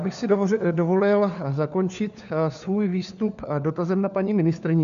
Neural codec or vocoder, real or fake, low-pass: codec, 16 kHz, 4 kbps, FunCodec, trained on LibriTTS, 50 frames a second; fake; 7.2 kHz